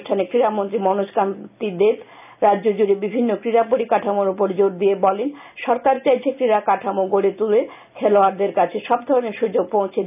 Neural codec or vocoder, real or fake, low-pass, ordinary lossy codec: none; real; 3.6 kHz; none